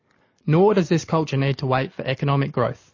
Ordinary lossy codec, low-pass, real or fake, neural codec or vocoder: MP3, 32 kbps; 7.2 kHz; real; none